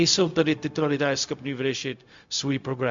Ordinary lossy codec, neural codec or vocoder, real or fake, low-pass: MP3, 64 kbps; codec, 16 kHz, 0.4 kbps, LongCat-Audio-Codec; fake; 7.2 kHz